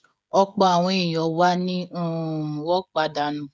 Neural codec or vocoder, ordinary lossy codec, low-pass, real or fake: codec, 16 kHz, 16 kbps, FreqCodec, smaller model; none; none; fake